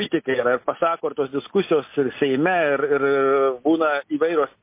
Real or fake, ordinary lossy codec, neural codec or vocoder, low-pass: real; MP3, 24 kbps; none; 3.6 kHz